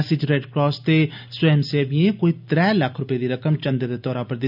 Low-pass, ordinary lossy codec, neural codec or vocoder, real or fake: 5.4 kHz; none; none; real